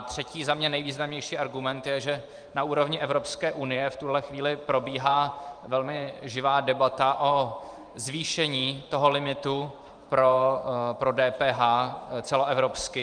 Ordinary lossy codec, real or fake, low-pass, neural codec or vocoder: Opus, 32 kbps; fake; 9.9 kHz; vocoder, 44.1 kHz, 128 mel bands every 512 samples, BigVGAN v2